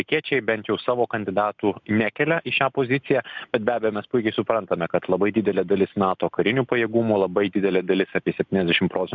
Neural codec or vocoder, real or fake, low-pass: none; real; 7.2 kHz